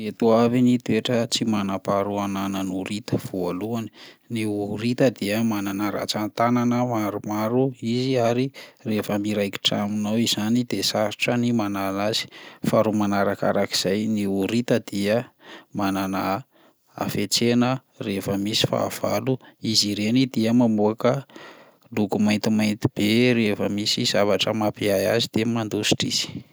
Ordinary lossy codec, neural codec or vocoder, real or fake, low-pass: none; none; real; none